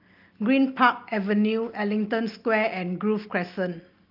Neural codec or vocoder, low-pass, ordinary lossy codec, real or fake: none; 5.4 kHz; Opus, 24 kbps; real